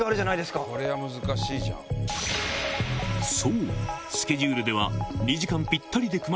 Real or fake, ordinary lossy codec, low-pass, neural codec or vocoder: real; none; none; none